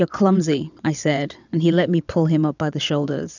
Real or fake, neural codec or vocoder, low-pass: fake; vocoder, 22.05 kHz, 80 mel bands, WaveNeXt; 7.2 kHz